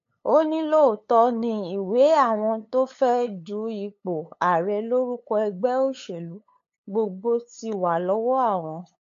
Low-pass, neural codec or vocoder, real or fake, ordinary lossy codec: 7.2 kHz; codec, 16 kHz, 8 kbps, FunCodec, trained on LibriTTS, 25 frames a second; fake; AAC, 48 kbps